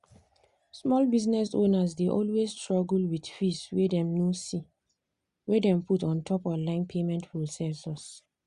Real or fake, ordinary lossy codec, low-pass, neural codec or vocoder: real; Opus, 64 kbps; 10.8 kHz; none